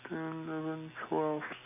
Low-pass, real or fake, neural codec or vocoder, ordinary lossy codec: 3.6 kHz; real; none; none